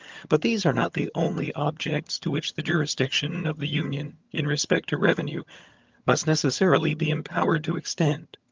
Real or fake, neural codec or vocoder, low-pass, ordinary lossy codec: fake; vocoder, 22.05 kHz, 80 mel bands, HiFi-GAN; 7.2 kHz; Opus, 24 kbps